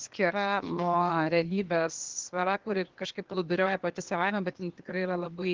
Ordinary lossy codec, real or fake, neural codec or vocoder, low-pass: Opus, 16 kbps; fake; codec, 16 kHz, 0.8 kbps, ZipCodec; 7.2 kHz